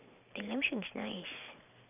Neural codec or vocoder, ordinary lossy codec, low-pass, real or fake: vocoder, 44.1 kHz, 128 mel bands every 512 samples, BigVGAN v2; none; 3.6 kHz; fake